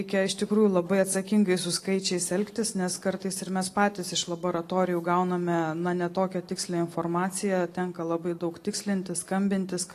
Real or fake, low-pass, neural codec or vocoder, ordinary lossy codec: real; 14.4 kHz; none; AAC, 48 kbps